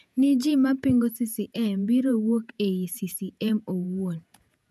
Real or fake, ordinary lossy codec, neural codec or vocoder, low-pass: fake; none; vocoder, 48 kHz, 128 mel bands, Vocos; 14.4 kHz